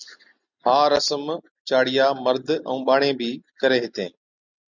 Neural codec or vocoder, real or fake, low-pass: none; real; 7.2 kHz